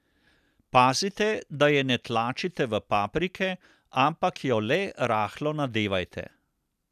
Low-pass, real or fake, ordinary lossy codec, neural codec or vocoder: 14.4 kHz; real; none; none